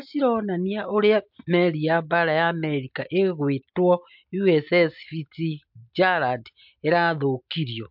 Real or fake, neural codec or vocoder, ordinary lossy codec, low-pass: real; none; MP3, 48 kbps; 5.4 kHz